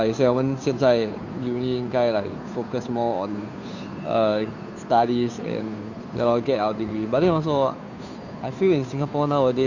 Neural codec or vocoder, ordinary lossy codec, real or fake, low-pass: codec, 16 kHz, 2 kbps, FunCodec, trained on Chinese and English, 25 frames a second; none; fake; 7.2 kHz